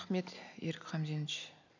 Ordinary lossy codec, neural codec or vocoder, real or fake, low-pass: none; none; real; 7.2 kHz